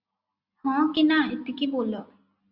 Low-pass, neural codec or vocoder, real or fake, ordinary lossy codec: 5.4 kHz; none; real; Opus, 64 kbps